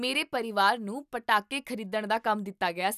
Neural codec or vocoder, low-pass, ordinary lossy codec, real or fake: none; 14.4 kHz; none; real